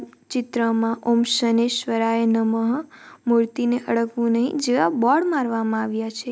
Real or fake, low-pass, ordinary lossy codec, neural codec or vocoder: real; none; none; none